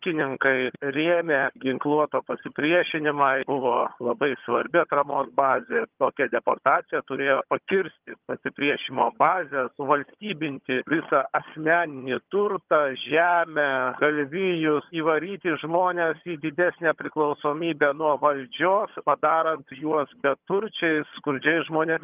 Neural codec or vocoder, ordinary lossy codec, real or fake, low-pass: vocoder, 22.05 kHz, 80 mel bands, HiFi-GAN; Opus, 24 kbps; fake; 3.6 kHz